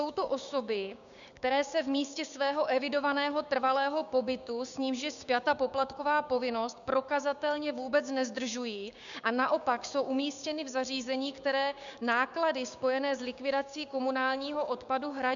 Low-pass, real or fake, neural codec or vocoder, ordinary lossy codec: 7.2 kHz; fake; codec, 16 kHz, 6 kbps, DAC; MP3, 96 kbps